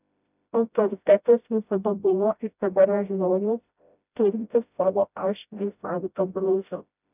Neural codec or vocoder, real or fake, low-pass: codec, 16 kHz, 0.5 kbps, FreqCodec, smaller model; fake; 3.6 kHz